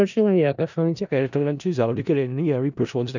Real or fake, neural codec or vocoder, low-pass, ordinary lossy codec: fake; codec, 16 kHz in and 24 kHz out, 0.4 kbps, LongCat-Audio-Codec, four codebook decoder; 7.2 kHz; none